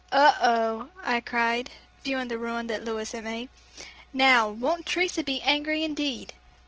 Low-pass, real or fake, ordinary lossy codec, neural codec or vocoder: 7.2 kHz; real; Opus, 16 kbps; none